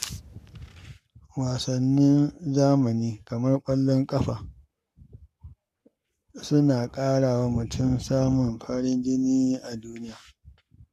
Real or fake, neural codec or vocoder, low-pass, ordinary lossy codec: fake; codec, 44.1 kHz, 7.8 kbps, Pupu-Codec; 14.4 kHz; none